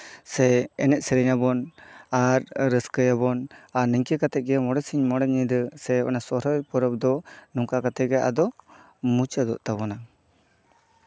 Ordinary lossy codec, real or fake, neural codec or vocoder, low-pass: none; real; none; none